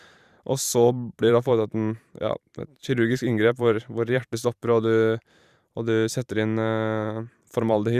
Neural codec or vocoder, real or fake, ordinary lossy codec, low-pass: vocoder, 44.1 kHz, 128 mel bands every 512 samples, BigVGAN v2; fake; Opus, 64 kbps; 14.4 kHz